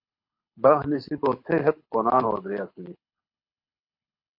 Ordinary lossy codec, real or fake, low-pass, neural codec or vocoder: MP3, 32 kbps; fake; 5.4 kHz; codec, 24 kHz, 6 kbps, HILCodec